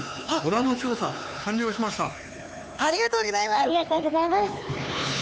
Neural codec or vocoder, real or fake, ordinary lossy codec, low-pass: codec, 16 kHz, 4 kbps, X-Codec, HuBERT features, trained on LibriSpeech; fake; none; none